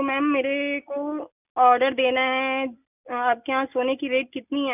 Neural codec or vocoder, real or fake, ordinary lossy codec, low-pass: none; real; none; 3.6 kHz